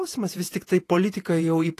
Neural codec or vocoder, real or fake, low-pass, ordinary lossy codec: vocoder, 48 kHz, 128 mel bands, Vocos; fake; 14.4 kHz; AAC, 48 kbps